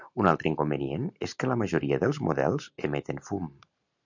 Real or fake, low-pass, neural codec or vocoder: real; 7.2 kHz; none